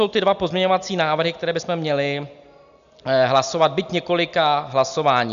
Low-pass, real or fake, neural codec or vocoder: 7.2 kHz; real; none